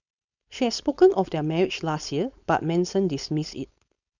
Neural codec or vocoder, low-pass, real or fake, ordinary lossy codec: codec, 16 kHz, 4.8 kbps, FACodec; 7.2 kHz; fake; none